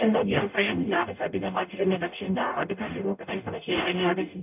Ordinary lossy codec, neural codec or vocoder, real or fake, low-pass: none; codec, 44.1 kHz, 0.9 kbps, DAC; fake; 3.6 kHz